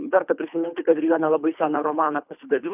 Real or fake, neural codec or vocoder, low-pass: fake; codec, 24 kHz, 3 kbps, HILCodec; 3.6 kHz